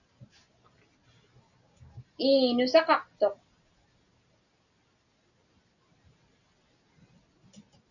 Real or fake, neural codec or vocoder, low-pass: real; none; 7.2 kHz